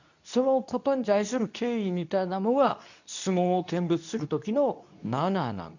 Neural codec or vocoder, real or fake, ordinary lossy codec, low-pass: codec, 24 kHz, 0.9 kbps, WavTokenizer, medium speech release version 2; fake; none; 7.2 kHz